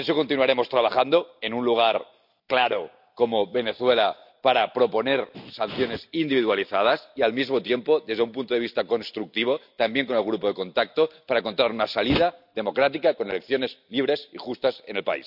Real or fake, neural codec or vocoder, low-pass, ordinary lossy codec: real; none; 5.4 kHz; none